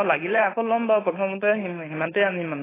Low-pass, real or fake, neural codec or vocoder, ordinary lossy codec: 3.6 kHz; fake; codec, 16 kHz, 4.8 kbps, FACodec; AAC, 16 kbps